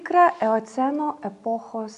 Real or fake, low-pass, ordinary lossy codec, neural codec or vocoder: real; 10.8 kHz; AAC, 64 kbps; none